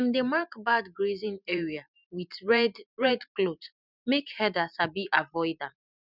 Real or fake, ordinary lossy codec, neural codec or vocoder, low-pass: fake; none; vocoder, 44.1 kHz, 128 mel bands every 512 samples, BigVGAN v2; 5.4 kHz